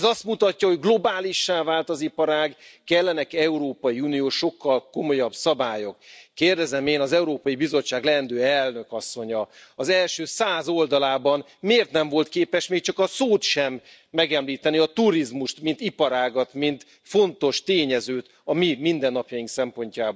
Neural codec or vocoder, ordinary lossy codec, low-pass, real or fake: none; none; none; real